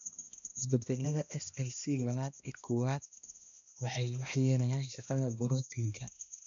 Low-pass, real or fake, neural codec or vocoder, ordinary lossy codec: 7.2 kHz; fake; codec, 16 kHz, 1 kbps, X-Codec, HuBERT features, trained on balanced general audio; none